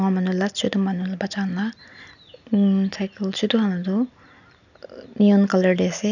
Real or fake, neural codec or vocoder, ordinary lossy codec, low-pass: real; none; none; 7.2 kHz